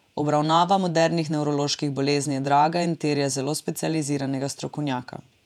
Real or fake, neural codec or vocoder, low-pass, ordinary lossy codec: fake; vocoder, 48 kHz, 128 mel bands, Vocos; 19.8 kHz; none